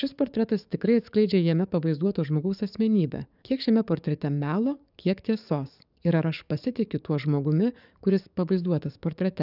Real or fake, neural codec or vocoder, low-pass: fake; codec, 44.1 kHz, 7.8 kbps, DAC; 5.4 kHz